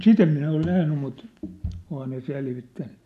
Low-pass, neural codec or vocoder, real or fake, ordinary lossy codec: 14.4 kHz; none; real; none